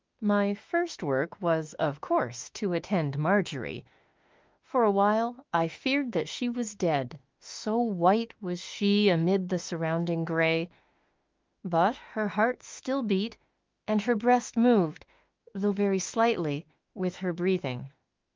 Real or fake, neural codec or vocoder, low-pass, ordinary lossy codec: fake; autoencoder, 48 kHz, 32 numbers a frame, DAC-VAE, trained on Japanese speech; 7.2 kHz; Opus, 32 kbps